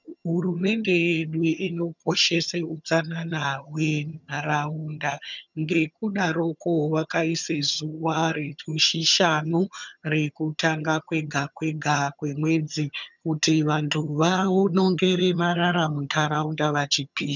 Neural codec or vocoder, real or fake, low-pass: vocoder, 22.05 kHz, 80 mel bands, HiFi-GAN; fake; 7.2 kHz